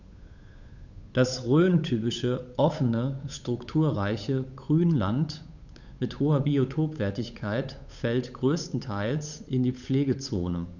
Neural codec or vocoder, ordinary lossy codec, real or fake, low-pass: codec, 16 kHz, 8 kbps, FunCodec, trained on Chinese and English, 25 frames a second; none; fake; 7.2 kHz